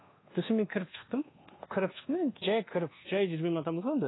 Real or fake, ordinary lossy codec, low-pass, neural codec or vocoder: fake; AAC, 16 kbps; 7.2 kHz; codec, 24 kHz, 1.2 kbps, DualCodec